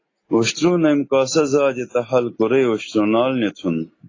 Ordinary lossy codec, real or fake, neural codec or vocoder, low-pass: AAC, 32 kbps; real; none; 7.2 kHz